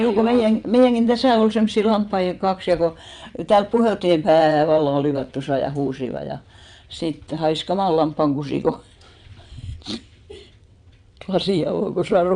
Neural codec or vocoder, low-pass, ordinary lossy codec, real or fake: vocoder, 22.05 kHz, 80 mel bands, WaveNeXt; 9.9 kHz; none; fake